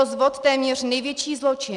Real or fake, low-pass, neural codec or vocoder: real; 10.8 kHz; none